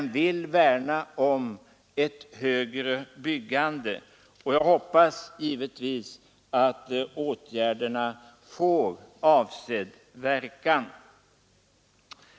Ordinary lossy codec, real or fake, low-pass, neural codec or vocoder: none; real; none; none